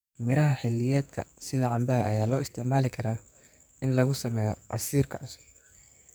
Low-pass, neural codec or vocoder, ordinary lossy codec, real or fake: none; codec, 44.1 kHz, 2.6 kbps, SNAC; none; fake